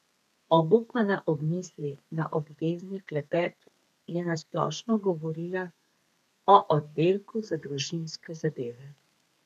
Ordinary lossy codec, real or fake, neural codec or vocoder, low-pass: none; fake; codec, 32 kHz, 1.9 kbps, SNAC; 14.4 kHz